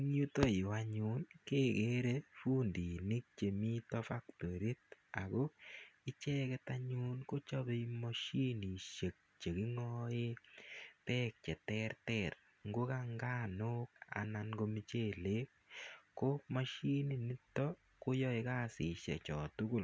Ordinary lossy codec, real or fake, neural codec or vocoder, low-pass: none; real; none; none